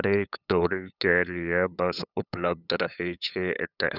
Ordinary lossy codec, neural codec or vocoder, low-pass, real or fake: none; codec, 16 kHz, 8 kbps, FunCodec, trained on LibriTTS, 25 frames a second; 5.4 kHz; fake